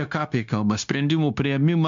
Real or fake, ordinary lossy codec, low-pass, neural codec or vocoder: fake; MP3, 64 kbps; 7.2 kHz; codec, 16 kHz, 0.9 kbps, LongCat-Audio-Codec